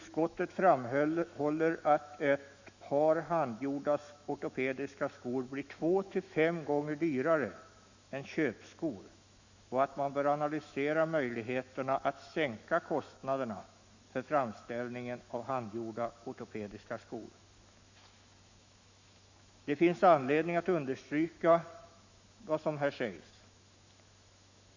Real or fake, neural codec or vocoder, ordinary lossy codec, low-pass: fake; autoencoder, 48 kHz, 128 numbers a frame, DAC-VAE, trained on Japanese speech; Opus, 64 kbps; 7.2 kHz